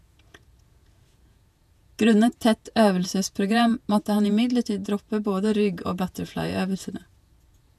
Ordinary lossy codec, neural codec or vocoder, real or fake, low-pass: none; vocoder, 48 kHz, 128 mel bands, Vocos; fake; 14.4 kHz